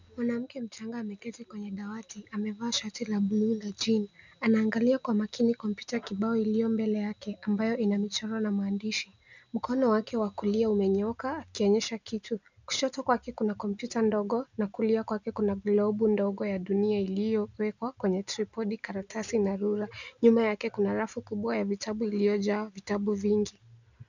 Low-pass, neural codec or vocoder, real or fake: 7.2 kHz; none; real